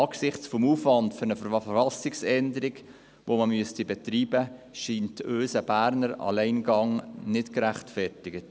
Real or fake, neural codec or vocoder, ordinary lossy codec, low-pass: real; none; none; none